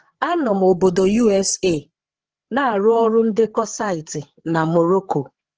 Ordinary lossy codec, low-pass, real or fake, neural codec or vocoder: Opus, 16 kbps; 7.2 kHz; fake; codec, 16 kHz, 4 kbps, FreqCodec, larger model